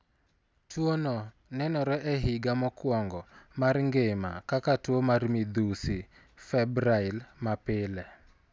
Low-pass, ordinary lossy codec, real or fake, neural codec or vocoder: none; none; real; none